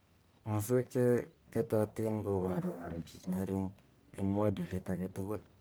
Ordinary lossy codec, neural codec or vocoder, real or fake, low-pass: none; codec, 44.1 kHz, 1.7 kbps, Pupu-Codec; fake; none